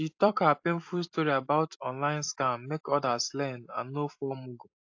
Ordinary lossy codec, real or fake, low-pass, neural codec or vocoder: AAC, 48 kbps; fake; 7.2 kHz; vocoder, 44.1 kHz, 128 mel bands every 256 samples, BigVGAN v2